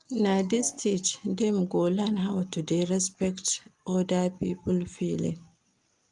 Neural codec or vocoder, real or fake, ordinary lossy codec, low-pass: none; real; Opus, 32 kbps; 10.8 kHz